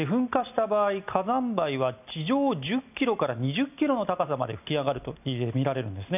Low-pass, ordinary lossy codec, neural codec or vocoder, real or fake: 3.6 kHz; none; none; real